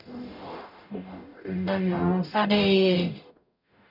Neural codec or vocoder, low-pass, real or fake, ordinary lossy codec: codec, 44.1 kHz, 0.9 kbps, DAC; 5.4 kHz; fake; none